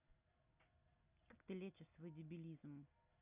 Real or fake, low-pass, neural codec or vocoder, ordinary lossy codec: real; 3.6 kHz; none; none